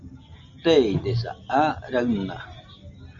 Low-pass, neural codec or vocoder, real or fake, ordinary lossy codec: 7.2 kHz; none; real; MP3, 48 kbps